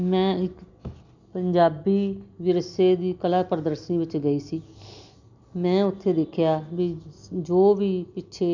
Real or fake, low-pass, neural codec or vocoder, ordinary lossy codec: real; 7.2 kHz; none; none